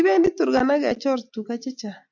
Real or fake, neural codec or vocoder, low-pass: real; none; 7.2 kHz